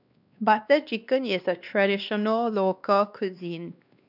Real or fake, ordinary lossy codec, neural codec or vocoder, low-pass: fake; none; codec, 16 kHz, 2 kbps, X-Codec, WavLM features, trained on Multilingual LibriSpeech; 5.4 kHz